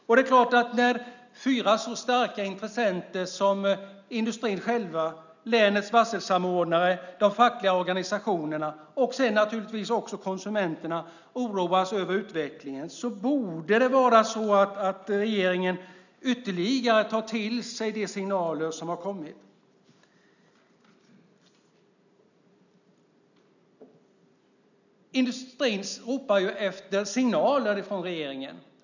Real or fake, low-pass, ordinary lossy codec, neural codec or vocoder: real; 7.2 kHz; none; none